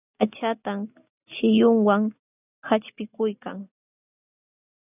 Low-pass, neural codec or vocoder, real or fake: 3.6 kHz; none; real